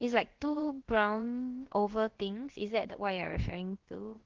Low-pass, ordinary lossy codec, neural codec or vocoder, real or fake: 7.2 kHz; Opus, 16 kbps; codec, 16 kHz, about 1 kbps, DyCAST, with the encoder's durations; fake